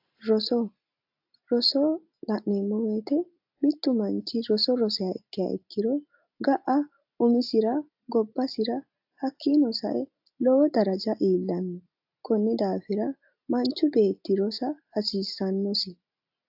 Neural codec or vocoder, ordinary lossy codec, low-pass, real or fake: none; AAC, 48 kbps; 5.4 kHz; real